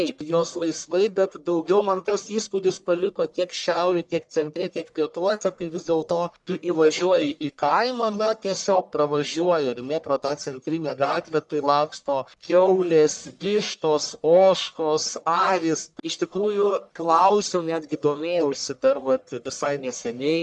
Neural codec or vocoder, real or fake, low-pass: codec, 44.1 kHz, 1.7 kbps, Pupu-Codec; fake; 10.8 kHz